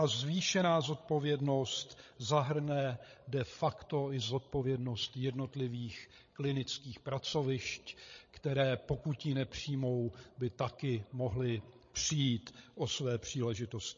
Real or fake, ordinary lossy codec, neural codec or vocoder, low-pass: fake; MP3, 32 kbps; codec, 16 kHz, 16 kbps, FreqCodec, larger model; 7.2 kHz